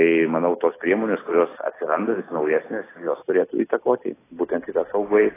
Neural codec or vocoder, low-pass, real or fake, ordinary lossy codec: none; 3.6 kHz; real; AAC, 16 kbps